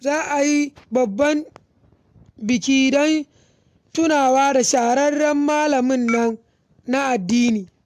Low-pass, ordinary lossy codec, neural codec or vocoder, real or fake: 14.4 kHz; AAC, 96 kbps; none; real